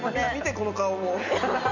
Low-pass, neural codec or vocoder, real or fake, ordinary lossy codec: 7.2 kHz; none; real; none